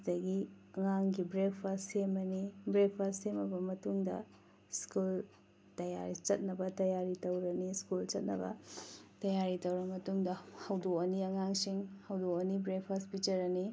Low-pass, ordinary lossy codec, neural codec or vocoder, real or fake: none; none; none; real